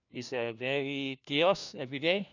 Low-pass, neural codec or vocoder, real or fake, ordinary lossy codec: 7.2 kHz; codec, 16 kHz, 1 kbps, FunCodec, trained on LibriTTS, 50 frames a second; fake; none